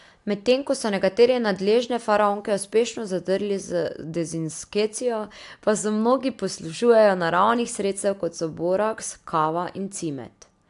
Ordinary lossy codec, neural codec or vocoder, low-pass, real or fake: AAC, 64 kbps; none; 10.8 kHz; real